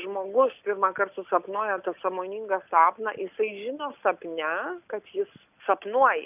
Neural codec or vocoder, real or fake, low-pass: none; real; 3.6 kHz